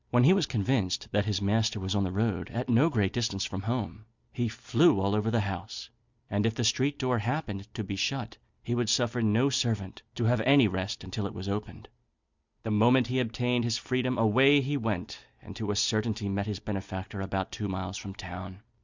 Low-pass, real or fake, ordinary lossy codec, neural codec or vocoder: 7.2 kHz; fake; Opus, 64 kbps; vocoder, 44.1 kHz, 128 mel bands every 256 samples, BigVGAN v2